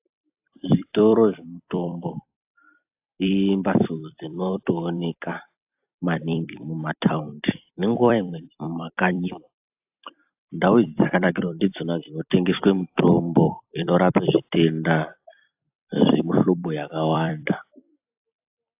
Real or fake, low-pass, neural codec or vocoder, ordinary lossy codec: fake; 3.6 kHz; vocoder, 44.1 kHz, 128 mel bands every 256 samples, BigVGAN v2; AAC, 32 kbps